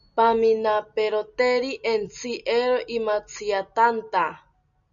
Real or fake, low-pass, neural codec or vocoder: real; 7.2 kHz; none